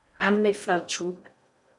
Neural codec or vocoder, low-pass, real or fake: codec, 16 kHz in and 24 kHz out, 0.6 kbps, FocalCodec, streaming, 4096 codes; 10.8 kHz; fake